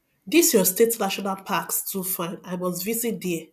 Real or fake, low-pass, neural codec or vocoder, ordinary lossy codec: real; 14.4 kHz; none; none